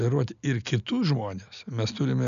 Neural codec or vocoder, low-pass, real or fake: none; 7.2 kHz; real